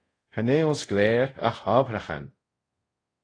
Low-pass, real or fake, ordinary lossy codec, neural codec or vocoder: 9.9 kHz; fake; AAC, 32 kbps; codec, 24 kHz, 0.5 kbps, DualCodec